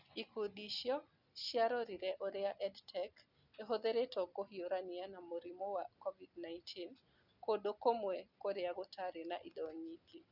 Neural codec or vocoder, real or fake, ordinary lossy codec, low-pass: none; real; none; 5.4 kHz